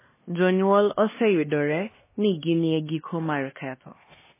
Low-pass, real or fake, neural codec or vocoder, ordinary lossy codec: 3.6 kHz; fake; codec, 16 kHz, 2 kbps, X-Codec, HuBERT features, trained on LibriSpeech; MP3, 16 kbps